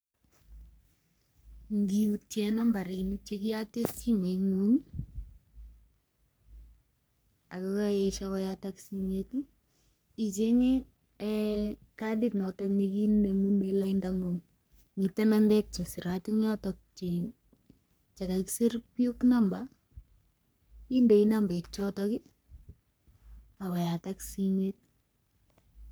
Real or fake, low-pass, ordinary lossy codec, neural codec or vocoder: fake; none; none; codec, 44.1 kHz, 3.4 kbps, Pupu-Codec